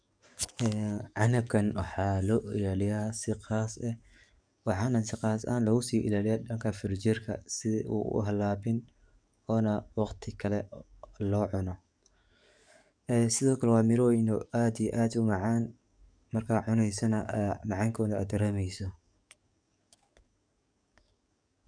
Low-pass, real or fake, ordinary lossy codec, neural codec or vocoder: 9.9 kHz; fake; none; codec, 44.1 kHz, 7.8 kbps, DAC